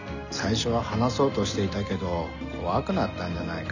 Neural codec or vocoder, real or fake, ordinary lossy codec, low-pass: none; real; none; 7.2 kHz